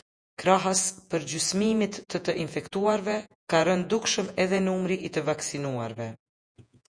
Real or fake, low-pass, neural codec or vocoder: fake; 9.9 kHz; vocoder, 48 kHz, 128 mel bands, Vocos